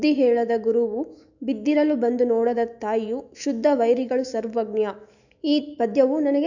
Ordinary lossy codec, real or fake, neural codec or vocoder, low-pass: none; fake; autoencoder, 48 kHz, 128 numbers a frame, DAC-VAE, trained on Japanese speech; 7.2 kHz